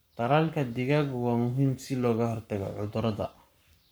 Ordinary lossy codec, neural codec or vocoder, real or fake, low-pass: none; codec, 44.1 kHz, 7.8 kbps, Pupu-Codec; fake; none